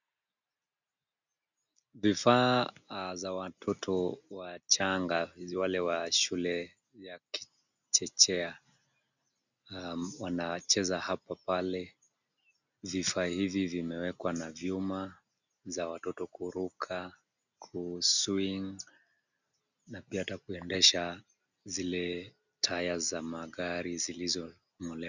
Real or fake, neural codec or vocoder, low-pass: real; none; 7.2 kHz